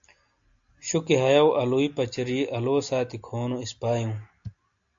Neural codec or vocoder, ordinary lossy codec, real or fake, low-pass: none; AAC, 64 kbps; real; 7.2 kHz